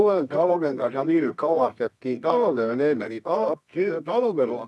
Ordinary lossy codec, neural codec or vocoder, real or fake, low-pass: none; codec, 24 kHz, 0.9 kbps, WavTokenizer, medium music audio release; fake; none